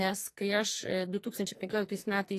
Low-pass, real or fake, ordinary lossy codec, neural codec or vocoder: 14.4 kHz; fake; AAC, 48 kbps; codec, 44.1 kHz, 2.6 kbps, SNAC